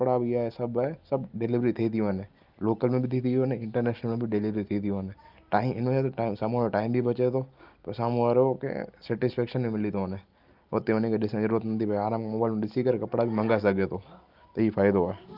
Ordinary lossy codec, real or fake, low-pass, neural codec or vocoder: Opus, 32 kbps; real; 5.4 kHz; none